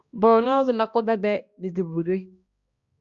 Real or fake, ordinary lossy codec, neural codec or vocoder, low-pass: fake; Opus, 64 kbps; codec, 16 kHz, 1 kbps, X-Codec, HuBERT features, trained on balanced general audio; 7.2 kHz